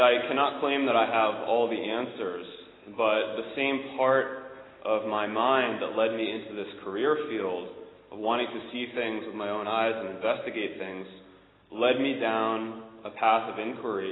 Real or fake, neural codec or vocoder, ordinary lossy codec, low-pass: real; none; AAC, 16 kbps; 7.2 kHz